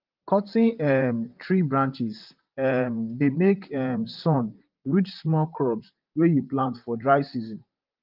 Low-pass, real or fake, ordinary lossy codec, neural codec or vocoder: 5.4 kHz; fake; Opus, 24 kbps; vocoder, 44.1 kHz, 128 mel bands, Pupu-Vocoder